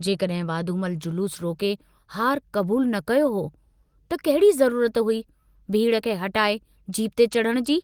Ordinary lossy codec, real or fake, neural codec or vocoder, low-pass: Opus, 24 kbps; real; none; 19.8 kHz